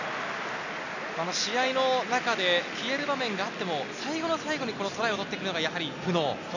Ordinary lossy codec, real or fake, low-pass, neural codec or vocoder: none; real; 7.2 kHz; none